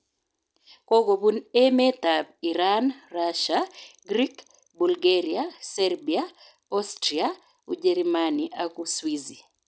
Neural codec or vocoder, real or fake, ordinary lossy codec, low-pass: none; real; none; none